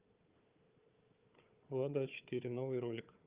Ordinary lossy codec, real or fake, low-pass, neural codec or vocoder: Opus, 24 kbps; fake; 3.6 kHz; codec, 16 kHz, 4 kbps, FunCodec, trained on Chinese and English, 50 frames a second